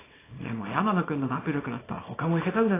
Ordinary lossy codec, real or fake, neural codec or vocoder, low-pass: AAC, 16 kbps; fake; codec, 24 kHz, 0.9 kbps, WavTokenizer, small release; 3.6 kHz